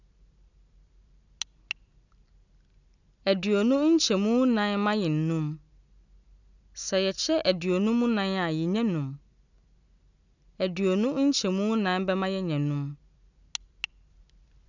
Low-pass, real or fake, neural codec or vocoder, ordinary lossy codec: 7.2 kHz; real; none; none